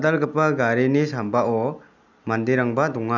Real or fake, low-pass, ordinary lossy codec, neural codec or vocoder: real; 7.2 kHz; none; none